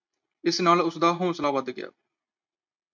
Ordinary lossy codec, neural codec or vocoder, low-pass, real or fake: MP3, 64 kbps; none; 7.2 kHz; real